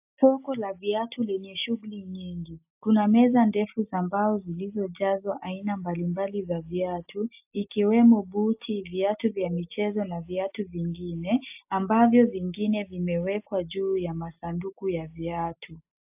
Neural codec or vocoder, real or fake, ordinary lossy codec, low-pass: none; real; AAC, 32 kbps; 3.6 kHz